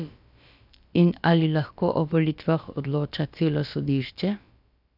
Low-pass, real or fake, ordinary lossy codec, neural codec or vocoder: 5.4 kHz; fake; AAC, 48 kbps; codec, 16 kHz, about 1 kbps, DyCAST, with the encoder's durations